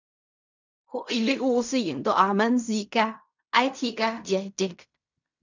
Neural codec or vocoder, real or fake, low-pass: codec, 16 kHz in and 24 kHz out, 0.4 kbps, LongCat-Audio-Codec, fine tuned four codebook decoder; fake; 7.2 kHz